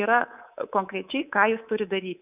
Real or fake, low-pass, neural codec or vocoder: fake; 3.6 kHz; codec, 24 kHz, 3.1 kbps, DualCodec